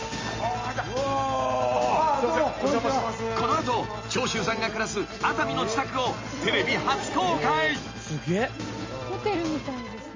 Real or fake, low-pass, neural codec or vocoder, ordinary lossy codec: real; 7.2 kHz; none; MP3, 32 kbps